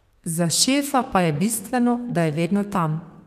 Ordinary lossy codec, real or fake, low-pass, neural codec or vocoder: none; fake; 14.4 kHz; codec, 32 kHz, 1.9 kbps, SNAC